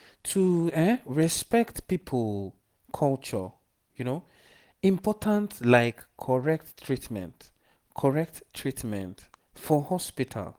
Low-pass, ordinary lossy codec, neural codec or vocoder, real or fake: 19.8 kHz; Opus, 24 kbps; none; real